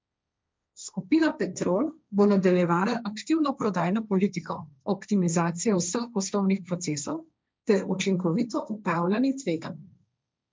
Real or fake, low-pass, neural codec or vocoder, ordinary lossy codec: fake; none; codec, 16 kHz, 1.1 kbps, Voila-Tokenizer; none